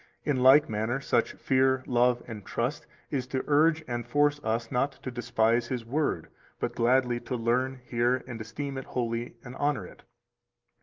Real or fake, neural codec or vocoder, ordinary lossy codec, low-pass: real; none; Opus, 32 kbps; 7.2 kHz